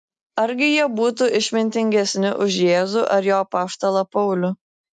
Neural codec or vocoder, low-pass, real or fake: none; 10.8 kHz; real